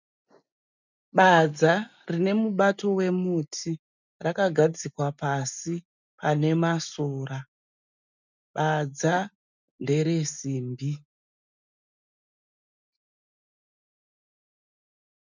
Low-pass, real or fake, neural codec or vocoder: 7.2 kHz; real; none